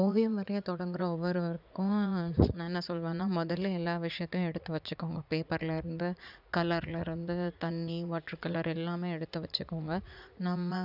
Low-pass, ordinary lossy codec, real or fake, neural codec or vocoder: 5.4 kHz; none; fake; vocoder, 44.1 kHz, 80 mel bands, Vocos